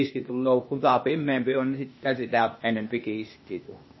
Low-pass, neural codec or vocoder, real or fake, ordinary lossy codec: 7.2 kHz; codec, 16 kHz, 0.8 kbps, ZipCodec; fake; MP3, 24 kbps